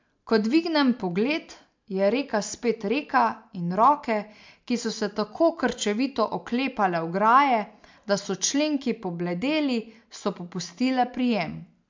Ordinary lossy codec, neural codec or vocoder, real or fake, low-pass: MP3, 64 kbps; none; real; 7.2 kHz